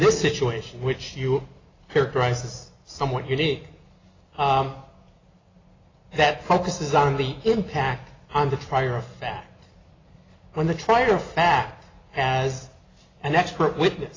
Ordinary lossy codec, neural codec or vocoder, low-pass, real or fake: AAC, 32 kbps; none; 7.2 kHz; real